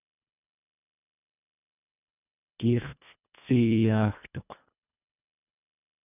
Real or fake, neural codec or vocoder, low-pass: fake; codec, 24 kHz, 1.5 kbps, HILCodec; 3.6 kHz